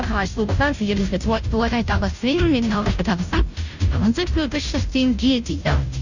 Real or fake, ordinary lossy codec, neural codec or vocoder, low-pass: fake; none; codec, 16 kHz, 0.5 kbps, FunCodec, trained on Chinese and English, 25 frames a second; 7.2 kHz